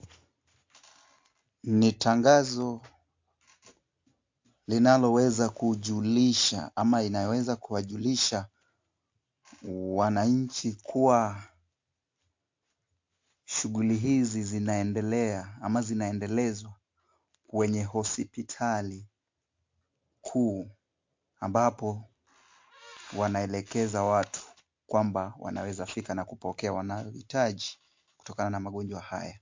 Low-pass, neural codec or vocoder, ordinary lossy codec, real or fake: 7.2 kHz; none; MP3, 48 kbps; real